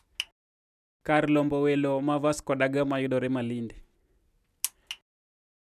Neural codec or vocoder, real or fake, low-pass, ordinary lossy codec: none; real; 14.4 kHz; none